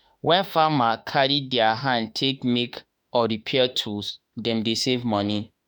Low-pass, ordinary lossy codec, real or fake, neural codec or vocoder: none; none; fake; autoencoder, 48 kHz, 32 numbers a frame, DAC-VAE, trained on Japanese speech